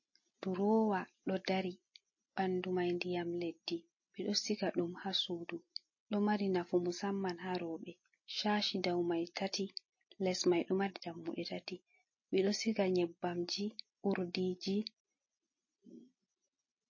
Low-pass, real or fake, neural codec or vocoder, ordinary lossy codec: 7.2 kHz; real; none; MP3, 32 kbps